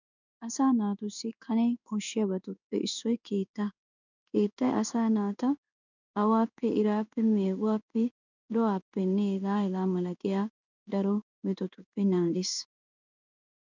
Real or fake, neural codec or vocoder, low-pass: fake; codec, 16 kHz in and 24 kHz out, 1 kbps, XY-Tokenizer; 7.2 kHz